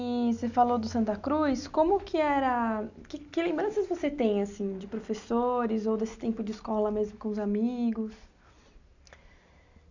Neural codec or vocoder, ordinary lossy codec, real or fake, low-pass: none; none; real; 7.2 kHz